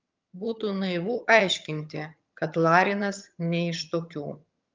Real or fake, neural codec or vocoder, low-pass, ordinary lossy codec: fake; vocoder, 22.05 kHz, 80 mel bands, HiFi-GAN; 7.2 kHz; Opus, 24 kbps